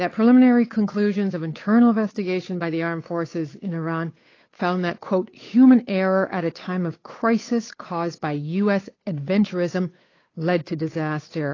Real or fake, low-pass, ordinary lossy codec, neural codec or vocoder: fake; 7.2 kHz; AAC, 32 kbps; codec, 16 kHz, 8 kbps, FunCodec, trained on Chinese and English, 25 frames a second